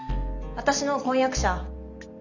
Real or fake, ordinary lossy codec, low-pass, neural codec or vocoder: real; none; 7.2 kHz; none